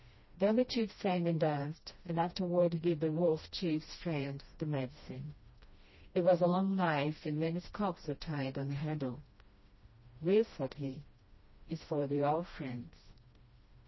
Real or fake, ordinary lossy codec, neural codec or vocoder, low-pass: fake; MP3, 24 kbps; codec, 16 kHz, 1 kbps, FreqCodec, smaller model; 7.2 kHz